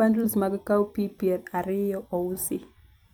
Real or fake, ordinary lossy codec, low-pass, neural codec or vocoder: fake; none; none; vocoder, 44.1 kHz, 128 mel bands every 256 samples, BigVGAN v2